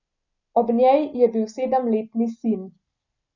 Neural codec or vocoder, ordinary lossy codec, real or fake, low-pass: none; none; real; 7.2 kHz